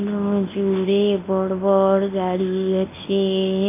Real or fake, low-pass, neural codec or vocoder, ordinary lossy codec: fake; 3.6 kHz; codec, 24 kHz, 0.9 kbps, WavTokenizer, medium speech release version 1; AAC, 16 kbps